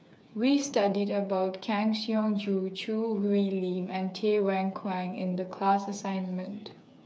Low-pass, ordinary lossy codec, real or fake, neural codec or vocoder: none; none; fake; codec, 16 kHz, 8 kbps, FreqCodec, smaller model